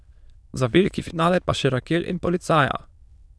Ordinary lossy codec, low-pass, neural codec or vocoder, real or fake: none; none; autoencoder, 22.05 kHz, a latent of 192 numbers a frame, VITS, trained on many speakers; fake